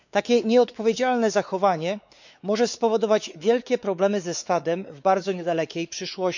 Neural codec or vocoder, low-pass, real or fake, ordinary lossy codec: codec, 16 kHz, 4 kbps, X-Codec, WavLM features, trained on Multilingual LibriSpeech; 7.2 kHz; fake; none